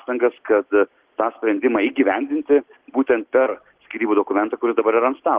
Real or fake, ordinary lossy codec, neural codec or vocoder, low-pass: real; Opus, 16 kbps; none; 3.6 kHz